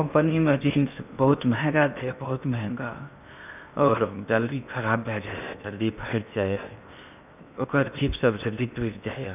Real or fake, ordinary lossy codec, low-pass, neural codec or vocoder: fake; none; 3.6 kHz; codec, 16 kHz in and 24 kHz out, 0.8 kbps, FocalCodec, streaming, 65536 codes